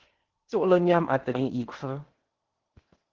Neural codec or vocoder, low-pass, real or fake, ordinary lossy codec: codec, 16 kHz in and 24 kHz out, 0.8 kbps, FocalCodec, streaming, 65536 codes; 7.2 kHz; fake; Opus, 16 kbps